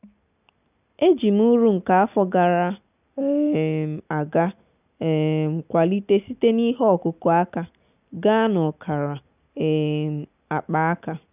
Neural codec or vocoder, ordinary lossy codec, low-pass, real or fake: none; none; 3.6 kHz; real